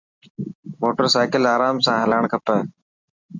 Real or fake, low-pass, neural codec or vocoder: real; 7.2 kHz; none